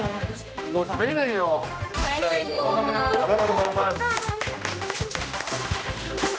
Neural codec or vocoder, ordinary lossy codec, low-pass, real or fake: codec, 16 kHz, 1 kbps, X-Codec, HuBERT features, trained on general audio; none; none; fake